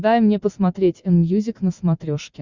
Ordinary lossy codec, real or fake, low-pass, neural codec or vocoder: Opus, 64 kbps; real; 7.2 kHz; none